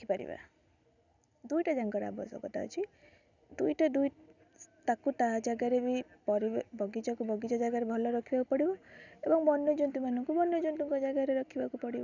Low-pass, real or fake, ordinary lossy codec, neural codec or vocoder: 7.2 kHz; real; none; none